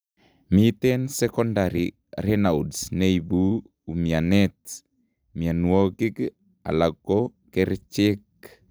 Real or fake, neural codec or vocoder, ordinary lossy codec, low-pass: real; none; none; none